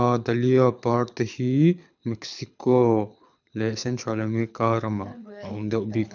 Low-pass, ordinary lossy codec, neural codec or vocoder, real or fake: 7.2 kHz; none; codec, 24 kHz, 6 kbps, HILCodec; fake